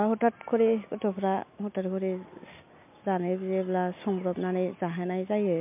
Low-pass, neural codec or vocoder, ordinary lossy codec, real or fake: 3.6 kHz; none; MP3, 32 kbps; real